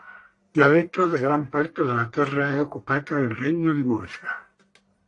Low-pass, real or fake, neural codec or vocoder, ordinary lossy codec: 10.8 kHz; fake; codec, 44.1 kHz, 1.7 kbps, Pupu-Codec; AAC, 48 kbps